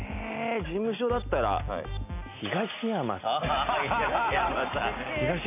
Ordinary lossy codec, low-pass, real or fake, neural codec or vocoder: none; 3.6 kHz; real; none